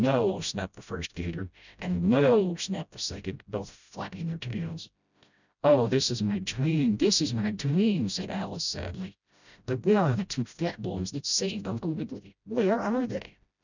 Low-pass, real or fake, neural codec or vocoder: 7.2 kHz; fake; codec, 16 kHz, 0.5 kbps, FreqCodec, smaller model